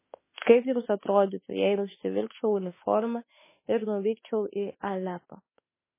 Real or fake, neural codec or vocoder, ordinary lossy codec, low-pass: fake; autoencoder, 48 kHz, 32 numbers a frame, DAC-VAE, trained on Japanese speech; MP3, 16 kbps; 3.6 kHz